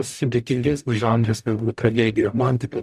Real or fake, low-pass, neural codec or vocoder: fake; 14.4 kHz; codec, 44.1 kHz, 0.9 kbps, DAC